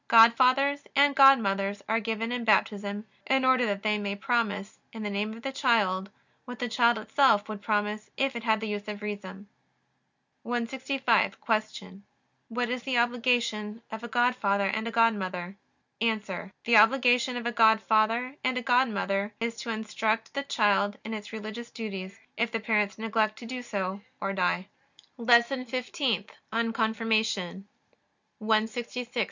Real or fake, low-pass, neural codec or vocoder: real; 7.2 kHz; none